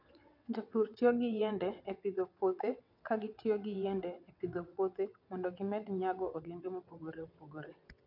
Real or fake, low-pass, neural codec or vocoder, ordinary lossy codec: fake; 5.4 kHz; vocoder, 22.05 kHz, 80 mel bands, WaveNeXt; none